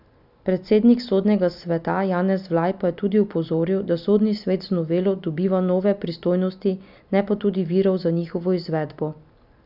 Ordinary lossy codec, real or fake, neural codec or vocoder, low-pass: none; real; none; 5.4 kHz